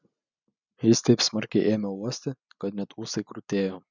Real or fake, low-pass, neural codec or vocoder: real; 7.2 kHz; none